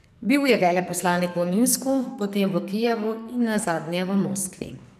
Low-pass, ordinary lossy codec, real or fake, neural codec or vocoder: 14.4 kHz; none; fake; codec, 44.1 kHz, 2.6 kbps, SNAC